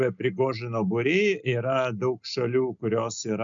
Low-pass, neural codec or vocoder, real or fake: 7.2 kHz; none; real